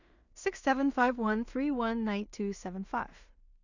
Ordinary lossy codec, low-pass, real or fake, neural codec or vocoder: none; 7.2 kHz; fake; codec, 16 kHz in and 24 kHz out, 0.4 kbps, LongCat-Audio-Codec, two codebook decoder